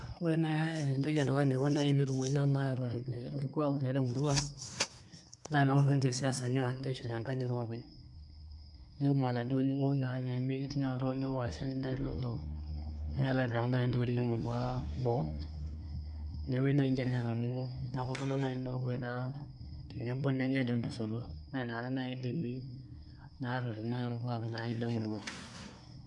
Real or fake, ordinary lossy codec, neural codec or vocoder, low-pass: fake; none; codec, 24 kHz, 1 kbps, SNAC; 10.8 kHz